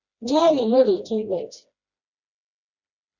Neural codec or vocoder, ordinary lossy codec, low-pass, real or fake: codec, 16 kHz, 1 kbps, FreqCodec, smaller model; Opus, 64 kbps; 7.2 kHz; fake